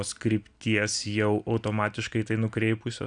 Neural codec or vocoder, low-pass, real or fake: none; 9.9 kHz; real